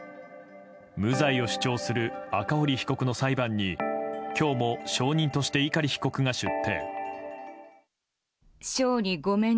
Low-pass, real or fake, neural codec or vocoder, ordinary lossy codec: none; real; none; none